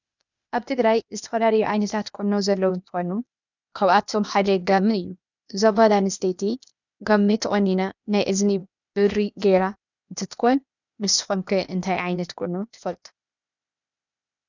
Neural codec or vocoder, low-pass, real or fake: codec, 16 kHz, 0.8 kbps, ZipCodec; 7.2 kHz; fake